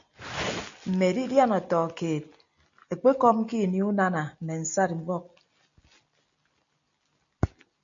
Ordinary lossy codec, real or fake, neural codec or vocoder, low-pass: MP3, 64 kbps; real; none; 7.2 kHz